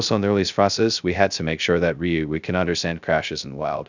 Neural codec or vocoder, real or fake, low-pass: codec, 16 kHz, 0.2 kbps, FocalCodec; fake; 7.2 kHz